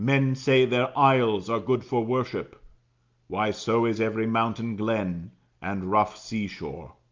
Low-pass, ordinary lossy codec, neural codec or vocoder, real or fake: 7.2 kHz; Opus, 32 kbps; none; real